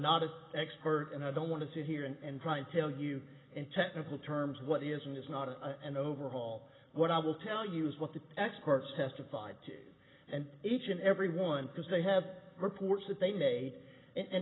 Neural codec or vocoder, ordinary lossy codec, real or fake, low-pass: none; AAC, 16 kbps; real; 7.2 kHz